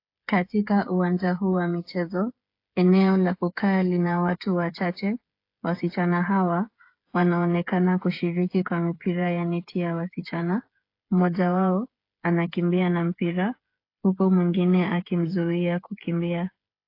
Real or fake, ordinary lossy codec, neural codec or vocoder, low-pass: fake; AAC, 32 kbps; codec, 16 kHz, 8 kbps, FreqCodec, smaller model; 5.4 kHz